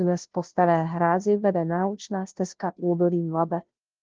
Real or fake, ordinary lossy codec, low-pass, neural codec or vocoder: fake; Opus, 16 kbps; 7.2 kHz; codec, 16 kHz, 0.5 kbps, FunCodec, trained on Chinese and English, 25 frames a second